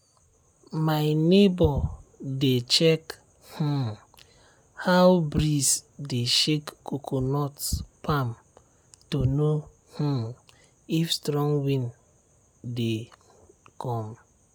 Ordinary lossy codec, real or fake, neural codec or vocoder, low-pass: none; real; none; none